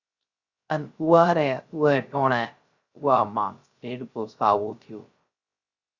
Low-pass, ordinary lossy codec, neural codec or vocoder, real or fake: 7.2 kHz; Opus, 64 kbps; codec, 16 kHz, 0.3 kbps, FocalCodec; fake